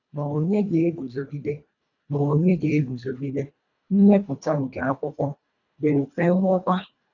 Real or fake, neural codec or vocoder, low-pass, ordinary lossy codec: fake; codec, 24 kHz, 1.5 kbps, HILCodec; 7.2 kHz; none